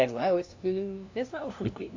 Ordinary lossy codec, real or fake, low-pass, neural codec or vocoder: AAC, 48 kbps; fake; 7.2 kHz; codec, 16 kHz, 0.5 kbps, FunCodec, trained on LibriTTS, 25 frames a second